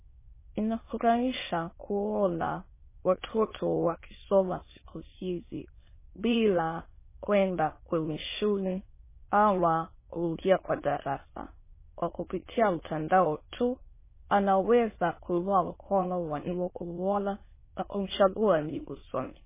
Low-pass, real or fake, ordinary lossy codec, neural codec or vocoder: 3.6 kHz; fake; MP3, 16 kbps; autoencoder, 22.05 kHz, a latent of 192 numbers a frame, VITS, trained on many speakers